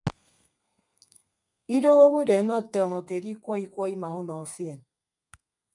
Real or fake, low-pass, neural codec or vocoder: fake; 10.8 kHz; codec, 32 kHz, 1.9 kbps, SNAC